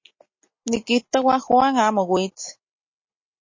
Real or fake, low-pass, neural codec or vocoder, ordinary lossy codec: real; 7.2 kHz; none; MP3, 32 kbps